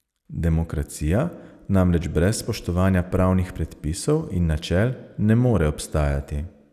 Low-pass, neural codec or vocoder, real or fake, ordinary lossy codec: 14.4 kHz; none; real; none